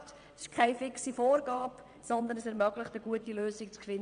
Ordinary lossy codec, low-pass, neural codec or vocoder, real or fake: none; 9.9 kHz; vocoder, 22.05 kHz, 80 mel bands, WaveNeXt; fake